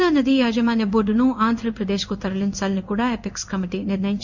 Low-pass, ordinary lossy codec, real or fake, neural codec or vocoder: 7.2 kHz; none; fake; codec, 16 kHz in and 24 kHz out, 1 kbps, XY-Tokenizer